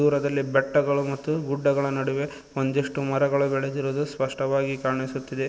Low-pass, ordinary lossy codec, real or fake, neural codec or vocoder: none; none; real; none